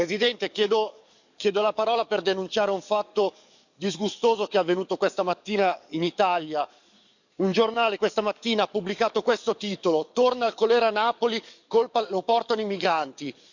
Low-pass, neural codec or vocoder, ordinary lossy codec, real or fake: 7.2 kHz; codec, 44.1 kHz, 7.8 kbps, DAC; none; fake